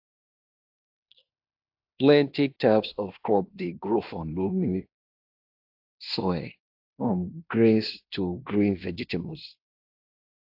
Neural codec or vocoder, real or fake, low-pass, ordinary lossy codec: codec, 16 kHz in and 24 kHz out, 0.9 kbps, LongCat-Audio-Codec, fine tuned four codebook decoder; fake; 5.4 kHz; AAC, 48 kbps